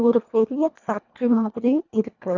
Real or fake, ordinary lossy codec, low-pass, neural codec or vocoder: fake; none; 7.2 kHz; codec, 24 kHz, 1.5 kbps, HILCodec